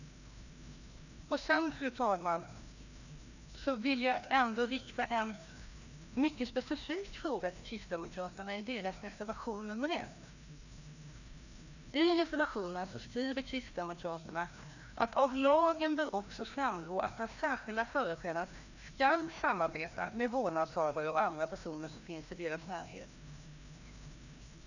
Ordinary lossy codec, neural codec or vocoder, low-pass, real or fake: none; codec, 16 kHz, 1 kbps, FreqCodec, larger model; 7.2 kHz; fake